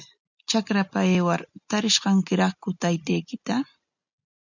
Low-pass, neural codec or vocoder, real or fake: 7.2 kHz; none; real